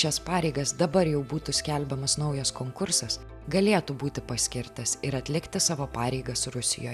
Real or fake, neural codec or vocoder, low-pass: real; none; 14.4 kHz